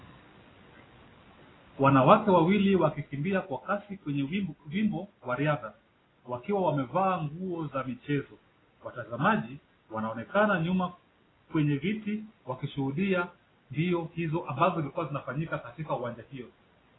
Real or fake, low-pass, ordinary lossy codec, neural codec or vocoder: real; 7.2 kHz; AAC, 16 kbps; none